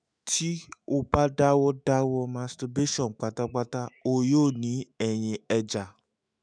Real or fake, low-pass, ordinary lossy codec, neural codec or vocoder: fake; 9.9 kHz; none; autoencoder, 48 kHz, 128 numbers a frame, DAC-VAE, trained on Japanese speech